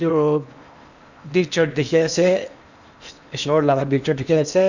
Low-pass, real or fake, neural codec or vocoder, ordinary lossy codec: 7.2 kHz; fake; codec, 16 kHz in and 24 kHz out, 0.8 kbps, FocalCodec, streaming, 65536 codes; none